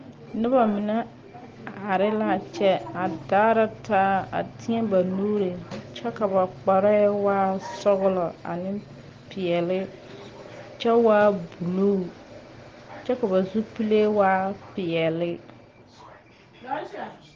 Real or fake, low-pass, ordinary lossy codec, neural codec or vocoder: real; 7.2 kHz; Opus, 16 kbps; none